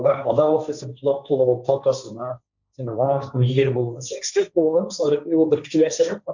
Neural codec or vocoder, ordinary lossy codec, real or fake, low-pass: codec, 16 kHz, 1.1 kbps, Voila-Tokenizer; none; fake; 7.2 kHz